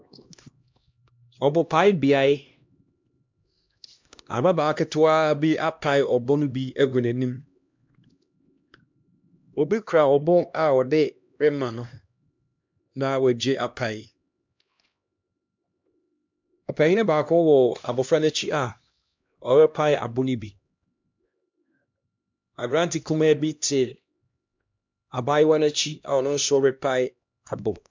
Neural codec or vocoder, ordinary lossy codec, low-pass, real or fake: codec, 16 kHz, 1 kbps, X-Codec, HuBERT features, trained on LibriSpeech; MP3, 64 kbps; 7.2 kHz; fake